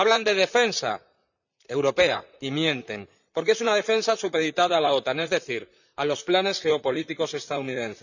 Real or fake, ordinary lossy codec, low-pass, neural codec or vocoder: fake; none; 7.2 kHz; vocoder, 44.1 kHz, 128 mel bands, Pupu-Vocoder